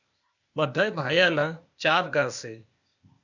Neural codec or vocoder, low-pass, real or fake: codec, 16 kHz, 0.8 kbps, ZipCodec; 7.2 kHz; fake